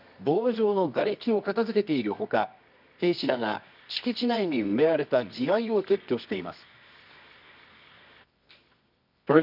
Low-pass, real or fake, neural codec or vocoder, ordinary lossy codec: 5.4 kHz; fake; codec, 24 kHz, 0.9 kbps, WavTokenizer, medium music audio release; none